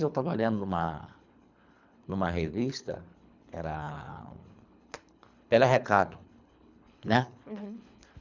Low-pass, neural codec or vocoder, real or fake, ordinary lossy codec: 7.2 kHz; codec, 24 kHz, 3 kbps, HILCodec; fake; none